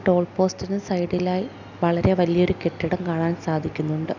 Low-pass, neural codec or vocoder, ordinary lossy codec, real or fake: 7.2 kHz; none; none; real